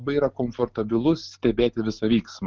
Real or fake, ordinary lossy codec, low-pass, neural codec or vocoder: real; Opus, 32 kbps; 7.2 kHz; none